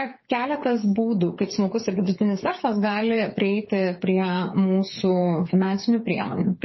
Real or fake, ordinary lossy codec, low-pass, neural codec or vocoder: fake; MP3, 24 kbps; 7.2 kHz; codec, 16 kHz, 8 kbps, FreqCodec, smaller model